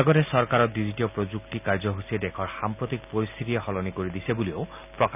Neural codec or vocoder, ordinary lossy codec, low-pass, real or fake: none; none; 3.6 kHz; real